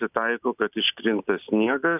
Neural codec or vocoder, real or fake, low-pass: none; real; 3.6 kHz